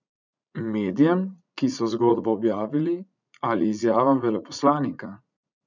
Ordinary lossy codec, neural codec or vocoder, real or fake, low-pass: none; vocoder, 44.1 kHz, 80 mel bands, Vocos; fake; 7.2 kHz